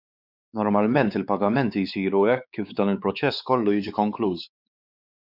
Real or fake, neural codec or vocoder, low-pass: fake; codec, 16 kHz, 4 kbps, X-Codec, WavLM features, trained on Multilingual LibriSpeech; 5.4 kHz